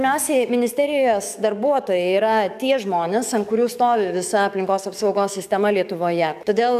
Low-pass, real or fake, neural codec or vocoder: 14.4 kHz; fake; codec, 44.1 kHz, 7.8 kbps, DAC